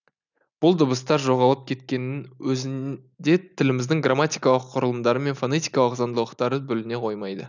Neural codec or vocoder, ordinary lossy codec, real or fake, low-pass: none; none; real; 7.2 kHz